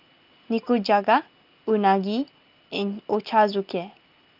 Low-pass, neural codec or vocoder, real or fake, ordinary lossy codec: 5.4 kHz; none; real; Opus, 32 kbps